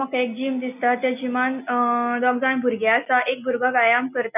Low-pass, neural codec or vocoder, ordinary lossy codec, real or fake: 3.6 kHz; none; none; real